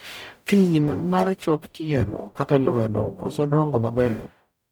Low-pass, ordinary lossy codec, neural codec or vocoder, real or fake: none; none; codec, 44.1 kHz, 0.9 kbps, DAC; fake